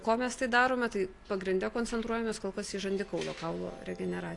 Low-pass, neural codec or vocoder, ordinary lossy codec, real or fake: 10.8 kHz; none; AAC, 48 kbps; real